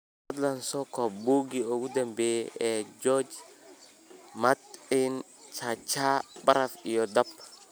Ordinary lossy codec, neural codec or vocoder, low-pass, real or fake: none; none; none; real